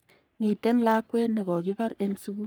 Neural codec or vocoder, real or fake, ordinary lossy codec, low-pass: codec, 44.1 kHz, 3.4 kbps, Pupu-Codec; fake; none; none